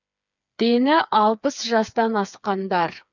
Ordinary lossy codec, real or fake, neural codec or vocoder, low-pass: none; fake; codec, 16 kHz, 4 kbps, FreqCodec, smaller model; 7.2 kHz